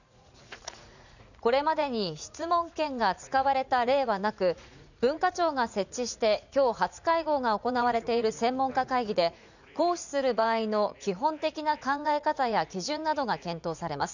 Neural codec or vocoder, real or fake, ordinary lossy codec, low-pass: vocoder, 44.1 kHz, 80 mel bands, Vocos; fake; none; 7.2 kHz